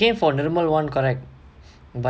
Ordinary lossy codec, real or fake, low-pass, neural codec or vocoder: none; real; none; none